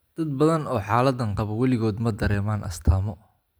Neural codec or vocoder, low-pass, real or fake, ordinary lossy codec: none; none; real; none